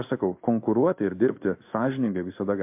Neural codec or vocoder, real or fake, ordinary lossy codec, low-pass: codec, 16 kHz in and 24 kHz out, 1 kbps, XY-Tokenizer; fake; MP3, 32 kbps; 3.6 kHz